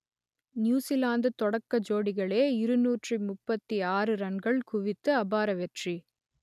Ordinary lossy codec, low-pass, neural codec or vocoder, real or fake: none; 14.4 kHz; none; real